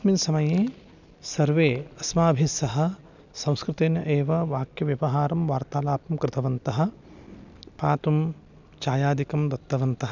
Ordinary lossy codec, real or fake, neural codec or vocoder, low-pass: none; real; none; 7.2 kHz